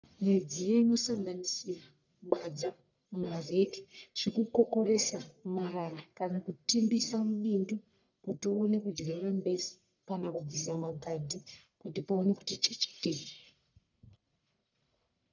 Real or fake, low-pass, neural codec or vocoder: fake; 7.2 kHz; codec, 44.1 kHz, 1.7 kbps, Pupu-Codec